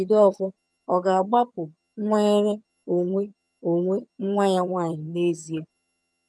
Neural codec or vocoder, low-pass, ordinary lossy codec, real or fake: vocoder, 22.05 kHz, 80 mel bands, HiFi-GAN; none; none; fake